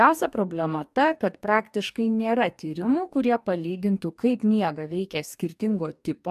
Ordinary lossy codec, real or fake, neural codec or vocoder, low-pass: AAC, 96 kbps; fake; codec, 44.1 kHz, 2.6 kbps, DAC; 14.4 kHz